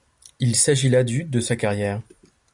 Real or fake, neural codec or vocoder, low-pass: real; none; 10.8 kHz